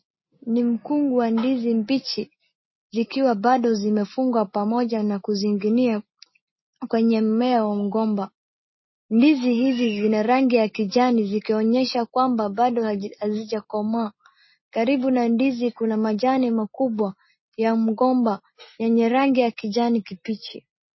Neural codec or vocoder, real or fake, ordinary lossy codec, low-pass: none; real; MP3, 24 kbps; 7.2 kHz